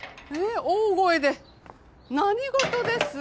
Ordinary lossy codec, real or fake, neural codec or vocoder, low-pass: none; real; none; none